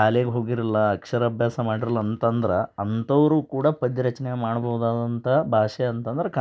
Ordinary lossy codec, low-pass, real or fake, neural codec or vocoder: none; none; real; none